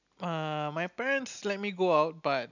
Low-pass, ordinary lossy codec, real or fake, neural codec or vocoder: 7.2 kHz; none; real; none